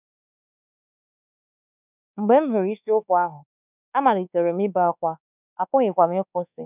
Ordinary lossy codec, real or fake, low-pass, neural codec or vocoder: none; fake; 3.6 kHz; codec, 24 kHz, 1.2 kbps, DualCodec